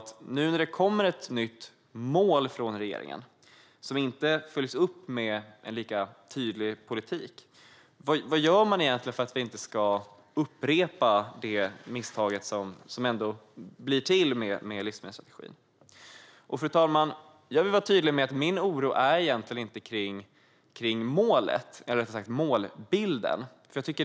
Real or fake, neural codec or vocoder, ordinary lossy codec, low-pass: real; none; none; none